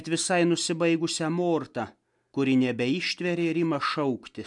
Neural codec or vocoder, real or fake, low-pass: none; real; 10.8 kHz